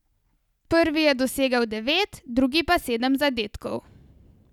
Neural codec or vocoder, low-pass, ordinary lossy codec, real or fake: none; 19.8 kHz; none; real